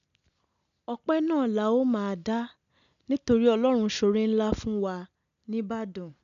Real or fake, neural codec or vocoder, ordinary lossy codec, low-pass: real; none; none; 7.2 kHz